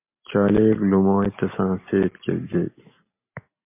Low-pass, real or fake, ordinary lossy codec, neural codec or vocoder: 3.6 kHz; real; MP3, 24 kbps; none